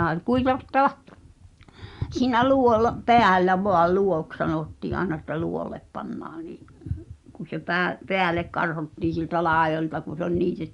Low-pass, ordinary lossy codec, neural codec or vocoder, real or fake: 10.8 kHz; Opus, 64 kbps; none; real